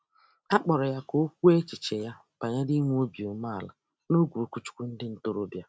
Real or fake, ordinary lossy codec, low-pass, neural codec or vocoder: real; none; none; none